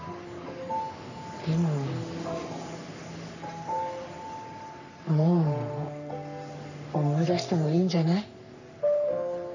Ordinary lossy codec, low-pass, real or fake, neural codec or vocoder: none; 7.2 kHz; fake; codec, 44.1 kHz, 3.4 kbps, Pupu-Codec